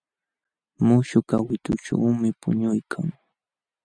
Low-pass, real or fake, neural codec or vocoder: 9.9 kHz; real; none